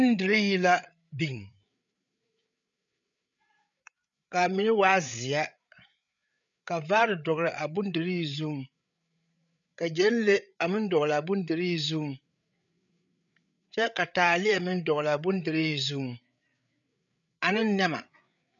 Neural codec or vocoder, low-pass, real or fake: codec, 16 kHz, 8 kbps, FreqCodec, larger model; 7.2 kHz; fake